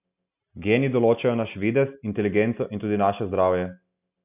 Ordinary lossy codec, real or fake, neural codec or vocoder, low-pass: none; real; none; 3.6 kHz